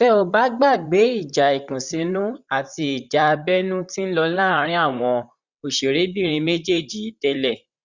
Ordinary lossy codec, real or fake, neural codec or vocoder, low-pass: Opus, 64 kbps; fake; vocoder, 44.1 kHz, 128 mel bands, Pupu-Vocoder; 7.2 kHz